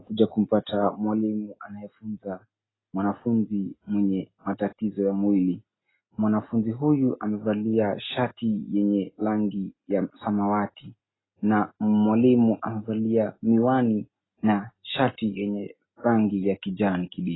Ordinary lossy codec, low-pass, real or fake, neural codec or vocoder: AAC, 16 kbps; 7.2 kHz; real; none